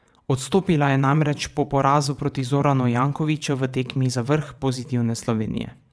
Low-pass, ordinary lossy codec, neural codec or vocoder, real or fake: none; none; vocoder, 22.05 kHz, 80 mel bands, WaveNeXt; fake